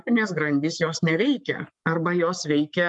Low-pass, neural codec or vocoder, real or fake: 10.8 kHz; codec, 44.1 kHz, 7.8 kbps, Pupu-Codec; fake